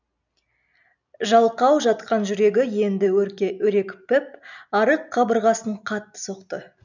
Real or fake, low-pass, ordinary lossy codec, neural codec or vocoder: real; 7.2 kHz; none; none